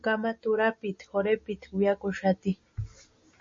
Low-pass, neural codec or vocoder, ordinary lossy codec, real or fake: 7.2 kHz; none; MP3, 32 kbps; real